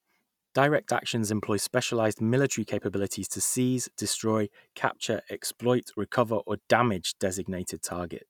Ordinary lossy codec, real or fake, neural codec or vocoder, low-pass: none; real; none; 19.8 kHz